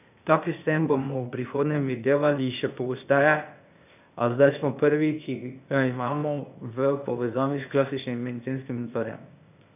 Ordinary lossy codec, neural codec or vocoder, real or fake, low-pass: none; codec, 16 kHz, 0.8 kbps, ZipCodec; fake; 3.6 kHz